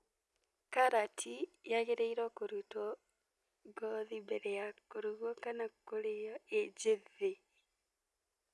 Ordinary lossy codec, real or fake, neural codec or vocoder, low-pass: none; real; none; none